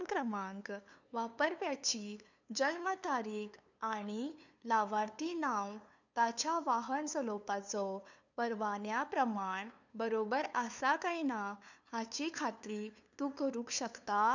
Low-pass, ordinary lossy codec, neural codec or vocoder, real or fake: 7.2 kHz; none; codec, 16 kHz, 2 kbps, FunCodec, trained on LibriTTS, 25 frames a second; fake